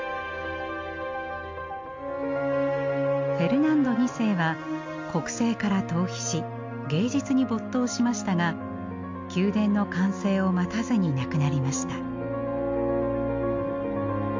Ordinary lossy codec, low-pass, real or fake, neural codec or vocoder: MP3, 48 kbps; 7.2 kHz; real; none